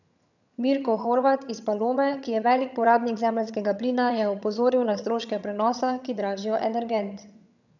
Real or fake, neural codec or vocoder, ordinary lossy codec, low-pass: fake; vocoder, 22.05 kHz, 80 mel bands, HiFi-GAN; none; 7.2 kHz